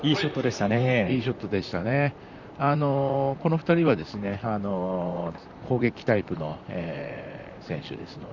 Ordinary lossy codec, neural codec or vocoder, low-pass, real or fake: Opus, 64 kbps; vocoder, 44.1 kHz, 128 mel bands, Pupu-Vocoder; 7.2 kHz; fake